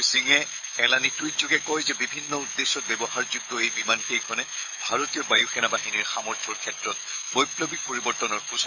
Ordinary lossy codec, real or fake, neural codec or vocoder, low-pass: none; fake; autoencoder, 48 kHz, 128 numbers a frame, DAC-VAE, trained on Japanese speech; 7.2 kHz